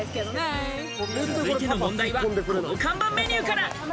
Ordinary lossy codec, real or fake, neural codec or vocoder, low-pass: none; real; none; none